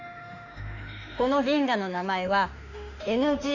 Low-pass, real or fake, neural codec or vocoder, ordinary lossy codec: 7.2 kHz; fake; autoencoder, 48 kHz, 32 numbers a frame, DAC-VAE, trained on Japanese speech; none